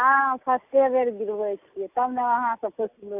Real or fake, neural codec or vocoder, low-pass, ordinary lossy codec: real; none; 3.6 kHz; AAC, 32 kbps